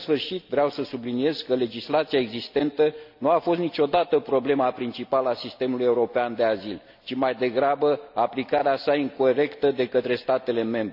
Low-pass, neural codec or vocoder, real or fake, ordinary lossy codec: 5.4 kHz; none; real; none